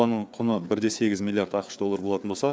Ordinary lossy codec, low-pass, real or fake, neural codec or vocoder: none; none; fake; codec, 16 kHz, 4 kbps, FreqCodec, larger model